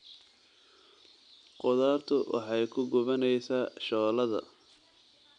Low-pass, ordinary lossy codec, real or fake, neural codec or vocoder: 9.9 kHz; none; real; none